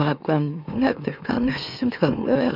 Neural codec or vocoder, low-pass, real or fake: autoencoder, 44.1 kHz, a latent of 192 numbers a frame, MeloTTS; 5.4 kHz; fake